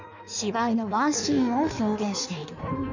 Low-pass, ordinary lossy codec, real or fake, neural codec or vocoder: 7.2 kHz; none; fake; codec, 16 kHz in and 24 kHz out, 1.1 kbps, FireRedTTS-2 codec